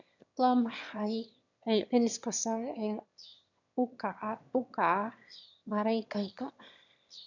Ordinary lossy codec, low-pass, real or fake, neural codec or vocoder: none; 7.2 kHz; fake; autoencoder, 22.05 kHz, a latent of 192 numbers a frame, VITS, trained on one speaker